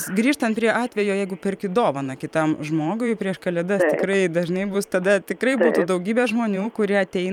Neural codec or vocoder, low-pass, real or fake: vocoder, 44.1 kHz, 128 mel bands every 512 samples, BigVGAN v2; 19.8 kHz; fake